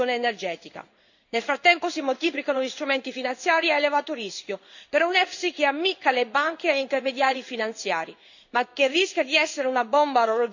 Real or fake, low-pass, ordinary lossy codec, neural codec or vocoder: fake; 7.2 kHz; none; codec, 16 kHz in and 24 kHz out, 1 kbps, XY-Tokenizer